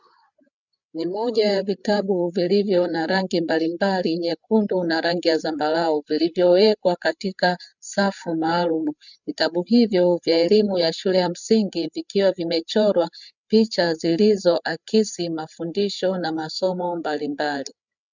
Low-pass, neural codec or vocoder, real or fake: 7.2 kHz; codec, 16 kHz, 8 kbps, FreqCodec, larger model; fake